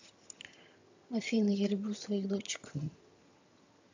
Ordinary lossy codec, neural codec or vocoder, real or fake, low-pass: AAC, 48 kbps; vocoder, 22.05 kHz, 80 mel bands, HiFi-GAN; fake; 7.2 kHz